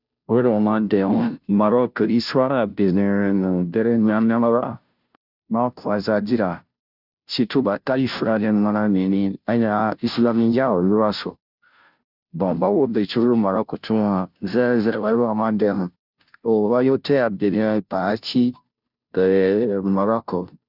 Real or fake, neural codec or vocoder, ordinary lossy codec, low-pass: fake; codec, 16 kHz, 0.5 kbps, FunCodec, trained on Chinese and English, 25 frames a second; none; 5.4 kHz